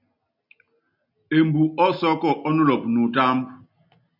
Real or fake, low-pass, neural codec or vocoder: real; 5.4 kHz; none